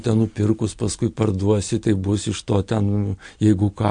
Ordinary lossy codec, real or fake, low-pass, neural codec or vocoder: MP3, 48 kbps; real; 9.9 kHz; none